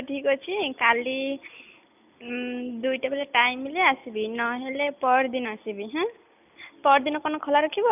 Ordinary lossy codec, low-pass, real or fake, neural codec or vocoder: none; 3.6 kHz; real; none